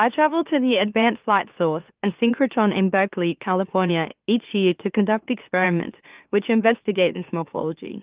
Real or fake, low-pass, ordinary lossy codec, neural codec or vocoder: fake; 3.6 kHz; Opus, 32 kbps; autoencoder, 44.1 kHz, a latent of 192 numbers a frame, MeloTTS